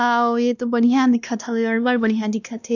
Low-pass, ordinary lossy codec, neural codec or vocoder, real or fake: 7.2 kHz; none; codec, 16 kHz, 1 kbps, X-Codec, WavLM features, trained on Multilingual LibriSpeech; fake